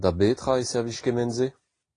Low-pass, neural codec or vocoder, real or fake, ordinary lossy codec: 9.9 kHz; none; real; AAC, 32 kbps